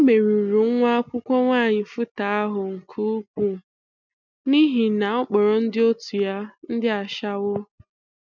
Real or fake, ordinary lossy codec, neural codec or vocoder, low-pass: real; none; none; 7.2 kHz